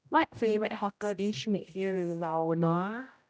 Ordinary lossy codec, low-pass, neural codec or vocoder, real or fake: none; none; codec, 16 kHz, 0.5 kbps, X-Codec, HuBERT features, trained on general audio; fake